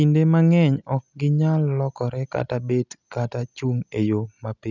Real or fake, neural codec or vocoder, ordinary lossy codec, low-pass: real; none; none; 7.2 kHz